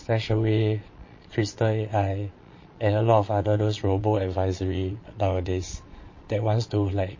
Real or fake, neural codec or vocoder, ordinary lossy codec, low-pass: fake; vocoder, 22.05 kHz, 80 mel bands, Vocos; MP3, 32 kbps; 7.2 kHz